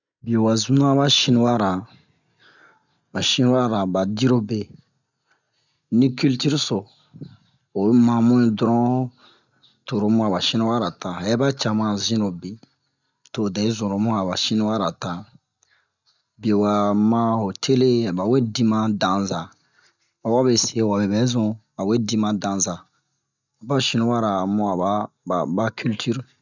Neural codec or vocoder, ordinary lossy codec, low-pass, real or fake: none; none; 7.2 kHz; real